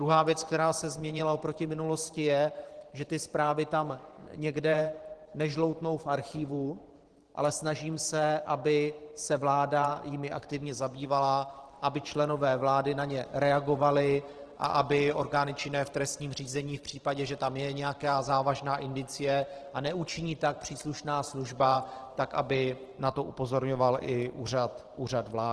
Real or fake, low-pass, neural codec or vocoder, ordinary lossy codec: fake; 10.8 kHz; vocoder, 44.1 kHz, 128 mel bands every 512 samples, BigVGAN v2; Opus, 16 kbps